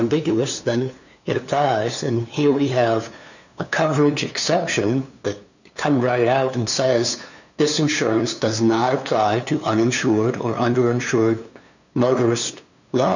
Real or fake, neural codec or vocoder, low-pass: fake; codec, 16 kHz, 2 kbps, FunCodec, trained on LibriTTS, 25 frames a second; 7.2 kHz